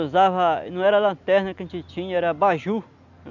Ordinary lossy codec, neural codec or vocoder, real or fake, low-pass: none; none; real; 7.2 kHz